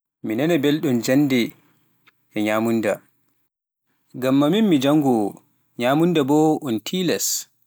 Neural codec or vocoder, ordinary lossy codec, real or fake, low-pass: none; none; real; none